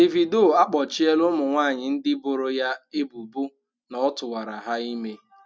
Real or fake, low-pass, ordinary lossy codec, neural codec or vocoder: real; none; none; none